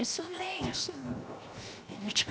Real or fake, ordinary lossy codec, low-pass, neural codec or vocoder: fake; none; none; codec, 16 kHz, 0.7 kbps, FocalCodec